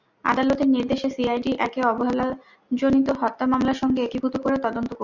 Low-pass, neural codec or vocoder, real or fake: 7.2 kHz; none; real